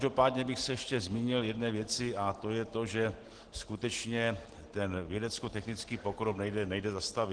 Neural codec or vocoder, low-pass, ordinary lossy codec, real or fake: none; 9.9 kHz; Opus, 16 kbps; real